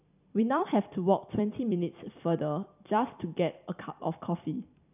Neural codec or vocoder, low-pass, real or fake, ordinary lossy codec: vocoder, 44.1 kHz, 128 mel bands every 256 samples, BigVGAN v2; 3.6 kHz; fake; none